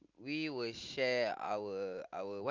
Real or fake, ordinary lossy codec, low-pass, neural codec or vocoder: real; Opus, 24 kbps; 7.2 kHz; none